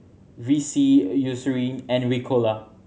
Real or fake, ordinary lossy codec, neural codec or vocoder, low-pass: real; none; none; none